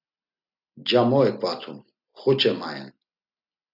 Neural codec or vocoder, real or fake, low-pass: none; real; 5.4 kHz